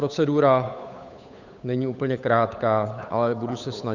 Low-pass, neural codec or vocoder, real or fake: 7.2 kHz; codec, 16 kHz, 8 kbps, FunCodec, trained on Chinese and English, 25 frames a second; fake